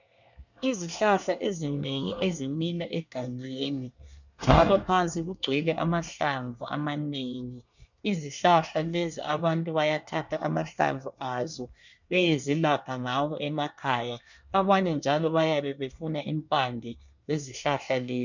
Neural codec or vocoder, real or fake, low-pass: codec, 24 kHz, 1 kbps, SNAC; fake; 7.2 kHz